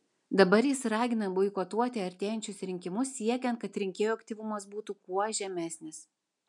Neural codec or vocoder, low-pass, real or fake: none; 10.8 kHz; real